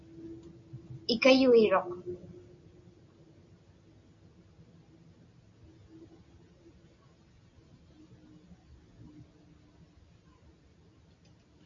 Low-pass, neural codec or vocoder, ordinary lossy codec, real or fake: 7.2 kHz; none; MP3, 48 kbps; real